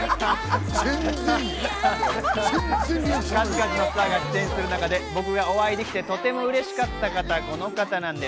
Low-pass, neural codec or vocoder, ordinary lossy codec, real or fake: none; none; none; real